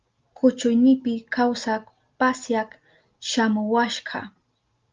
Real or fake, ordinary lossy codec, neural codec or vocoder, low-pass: real; Opus, 32 kbps; none; 7.2 kHz